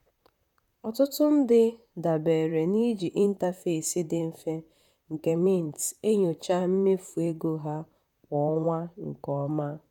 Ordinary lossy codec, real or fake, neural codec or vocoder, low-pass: none; fake; vocoder, 44.1 kHz, 128 mel bands, Pupu-Vocoder; 19.8 kHz